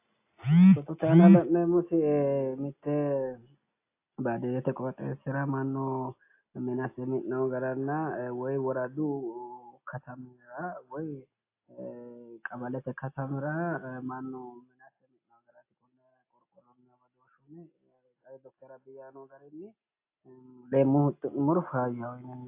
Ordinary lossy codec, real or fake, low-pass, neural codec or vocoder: AAC, 24 kbps; real; 3.6 kHz; none